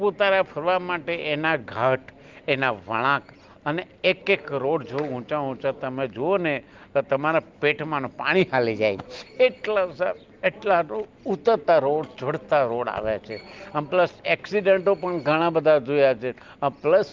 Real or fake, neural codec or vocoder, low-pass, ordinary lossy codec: real; none; 7.2 kHz; Opus, 32 kbps